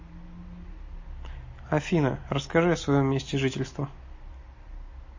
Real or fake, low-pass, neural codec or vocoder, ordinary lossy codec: real; 7.2 kHz; none; MP3, 32 kbps